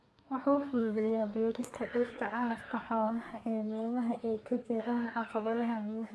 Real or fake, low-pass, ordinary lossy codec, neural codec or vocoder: fake; none; none; codec, 24 kHz, 1 kbps, SNAC